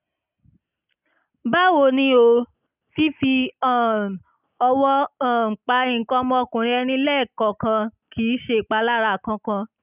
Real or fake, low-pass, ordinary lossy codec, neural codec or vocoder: real; 3.6 kHz; none; none